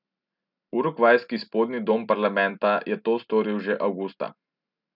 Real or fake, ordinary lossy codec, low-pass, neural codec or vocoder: real; none; 5.4 kHz; none